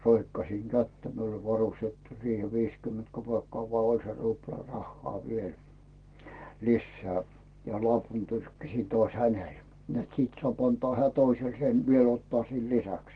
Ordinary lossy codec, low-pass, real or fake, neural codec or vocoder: Opus, 16 kbps; 9.9 kHz; real; none